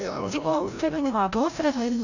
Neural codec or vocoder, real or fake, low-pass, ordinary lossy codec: codec, 16 kHz, 0.5 kbps, FreqCodec, larger model; fake; 7.2 kHz; none